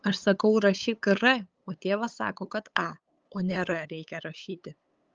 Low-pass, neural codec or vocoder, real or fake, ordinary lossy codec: 7.2 kHz; codec, 16 kHz, 8 kbps, FunCodec, trained on LibriTTS, 25 frames a second; fake; Opus, 24 kbps